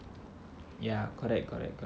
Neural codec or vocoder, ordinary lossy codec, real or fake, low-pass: none; none; real; none